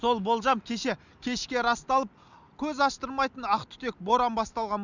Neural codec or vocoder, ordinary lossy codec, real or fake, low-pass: none; none; real; 7.2 kHz